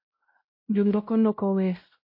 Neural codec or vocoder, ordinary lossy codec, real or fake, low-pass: codec, 16 kHz, 0.5 kbps, X-Codec, WavLM features, trained on Multilingual LibriSpeech; MP3, 32 kbps; fake; 5.4 kHz